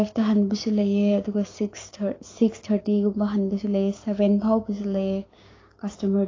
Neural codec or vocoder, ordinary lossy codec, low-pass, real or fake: codec, 16 kHz, 6 kbps, DAC; AAC, 32 kbps; 7.2 kHz; fake